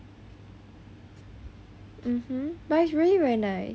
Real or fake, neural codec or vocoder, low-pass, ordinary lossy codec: real; none; none; none